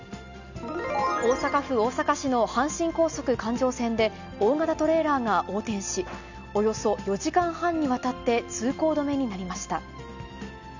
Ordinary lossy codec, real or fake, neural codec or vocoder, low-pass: none; real; none; 7.2 kHz